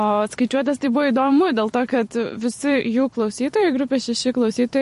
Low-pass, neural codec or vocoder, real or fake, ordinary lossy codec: 14.4 kHz; vocoder, 44.1 kHz, 128 mel bands every 256 samples, BigVGAN v2; fake; MP3, 48 kbps